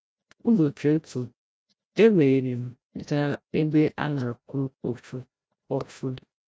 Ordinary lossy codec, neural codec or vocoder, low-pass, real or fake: none; codec, 16 kHz, 0.5 kbps, FreqCodec, larger model; none; fake